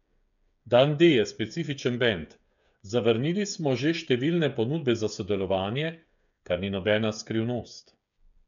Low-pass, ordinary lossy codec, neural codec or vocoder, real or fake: 7.2 kHz; none; codec, 16 kHz, 8 kbps, FreqCodec, smaller model; fake